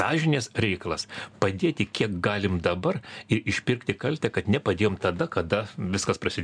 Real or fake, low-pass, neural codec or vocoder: real; 9.9 kHz; none